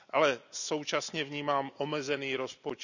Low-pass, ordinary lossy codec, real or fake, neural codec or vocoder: 7.2 kHz; none; real; none